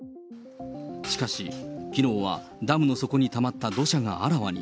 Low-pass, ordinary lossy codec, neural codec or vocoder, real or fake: none; none; none; real